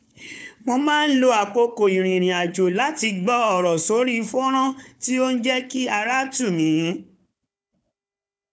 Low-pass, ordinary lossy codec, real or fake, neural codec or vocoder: none; none; fake; codec, 16 kHz, 4 kbps, FunCodec, trained on Chinese and English, 50 frames a second